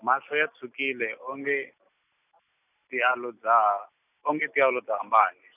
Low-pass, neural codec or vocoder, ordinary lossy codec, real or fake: 3.6 kHz; none; none; real